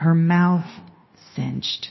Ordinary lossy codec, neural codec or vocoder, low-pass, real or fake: MP3, 24 kbps; codec, 16 kHz, 0.9 kbps, LongCat-Audio-Codec; 7.2 kHz; fake